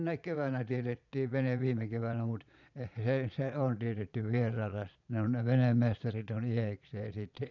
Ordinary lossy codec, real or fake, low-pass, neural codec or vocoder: none; fake; 7.2 kHz; vocoder, 22.05 kHz, 80 mel bands, Vocos